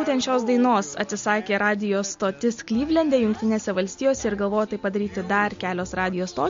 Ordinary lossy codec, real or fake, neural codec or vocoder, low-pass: MP3, 48 kbps; real; none; 7.2 kHz